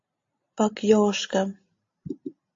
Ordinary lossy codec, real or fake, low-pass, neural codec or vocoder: AAC, 48 kbps; real; 7.2 kHz; none